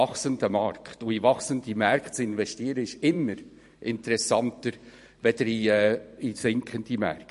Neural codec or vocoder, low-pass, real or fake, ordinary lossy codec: vocoder, 44.1 kHz, 128 mel bands every 512 samples, BigVGAN v2; 14.4 kHz; fake; MP3, 48 kbps